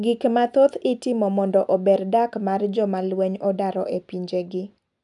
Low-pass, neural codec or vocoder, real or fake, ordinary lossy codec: 10.8 kHz; none; real; none